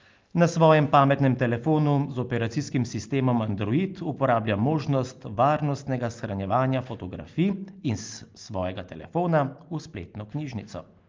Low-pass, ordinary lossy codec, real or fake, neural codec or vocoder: 7.2 kHz; Opus, 32 kbps; real; none